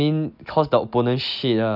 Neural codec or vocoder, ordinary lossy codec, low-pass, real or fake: none; none; 5.4 kHz; real